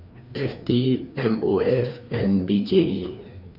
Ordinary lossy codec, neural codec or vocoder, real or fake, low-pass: none; codec, 16 kHz, 2 kbps, FreqCodec, larger model; fake; 5.4 kHz